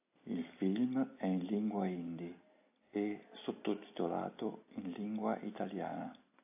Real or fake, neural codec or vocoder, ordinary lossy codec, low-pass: real; none; AAC, 24 kbps; 3.6 kHz